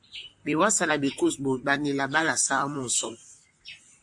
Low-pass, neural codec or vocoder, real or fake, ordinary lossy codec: 10.8 kHz; vocoder, 44.1 kHz, 128 mel bands, Pupu-Vocoder; fake; AAC, 64 kbps